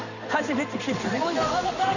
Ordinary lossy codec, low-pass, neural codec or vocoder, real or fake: none; 7.2 kHz; codec, 16 kHz in and 24 kHz out, 1 kbps, XY-Tokenizer; fake